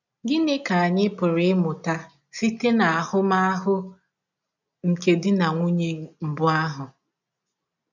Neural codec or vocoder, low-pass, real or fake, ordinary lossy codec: none; 7.2 kHz; real; none